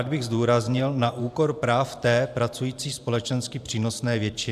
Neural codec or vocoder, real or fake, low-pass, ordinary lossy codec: vocoder, 44.1 kHz, 128 mel bands every 512 samples, BigVGAN v2; fake; 14.4 kHz; AAC, 96 kbps